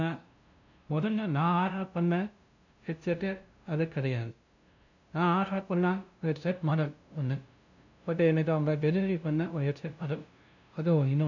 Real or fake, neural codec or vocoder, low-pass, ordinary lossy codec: fake; codec, 16 kHz, 0.5 kbps, FunCodec, trained on LibriTTS, 25 frames a second; 7.2 kHz; none